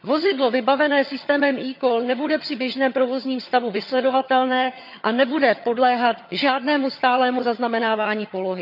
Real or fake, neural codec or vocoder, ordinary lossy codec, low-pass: fake; vocoder, 22.05 kHz, 80 mel bands, HiFi-GAN; none; 5.4 kHz